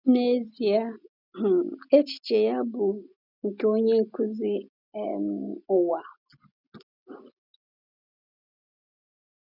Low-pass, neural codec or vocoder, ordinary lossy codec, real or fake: 5.4 kHz; none; none; real